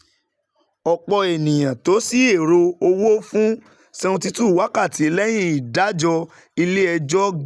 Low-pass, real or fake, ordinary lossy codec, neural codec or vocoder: none; real; none; none